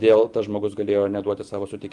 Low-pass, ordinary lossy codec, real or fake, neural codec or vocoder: 10.8 kHz; Opus, 32 kbps; real; none